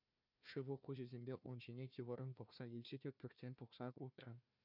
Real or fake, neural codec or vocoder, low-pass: fake; codec, 16 kHz, 1 kbps, FunCodec, trained on Chinese and English, 50 frames a second; 5.4 kHz